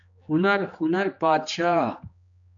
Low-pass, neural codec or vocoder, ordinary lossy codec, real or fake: 7.2 kHz; codec, 16 kHz, 2 kbps, X-Codec, HuBERT features, trained on general audio; AAC, 64 kbps; fake